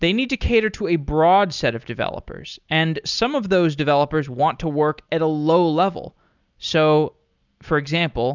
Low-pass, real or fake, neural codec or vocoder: 7.2 kHz; real; none